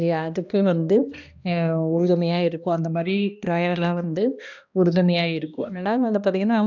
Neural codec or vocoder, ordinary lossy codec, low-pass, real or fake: codec, 16 kHz, 1 kbps, X-Codec, HuBERT features, trained on balanced general audio; none; 7.2 kHz; fake